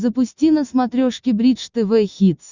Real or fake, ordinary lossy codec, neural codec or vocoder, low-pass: real; Opus, 64 kbps; none; 7.2 kHz